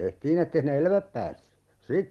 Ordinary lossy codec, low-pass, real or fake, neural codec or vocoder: Opus, 24 kbps; 19.8 kHz; fake; vocoder, 44.1 kHz, 128 mel bands every 256 samples, BigVGAN v2